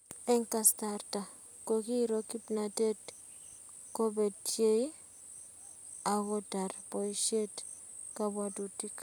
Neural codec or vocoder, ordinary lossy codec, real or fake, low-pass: none; none; real; none